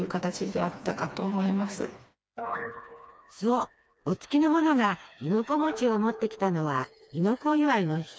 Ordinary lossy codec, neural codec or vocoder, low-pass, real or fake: none; codec, 16 kHz, 2 kbps, FreqCodec, smaller model; none; fake